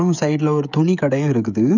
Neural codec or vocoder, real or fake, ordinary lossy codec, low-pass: codec, 16 kHz, 16 kbps, FreqCodec, smaller model; fake; none; 7.2 kHz